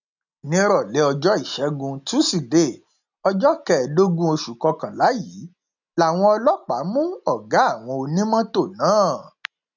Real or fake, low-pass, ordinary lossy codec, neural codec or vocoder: real; 7.2 kHz; none; none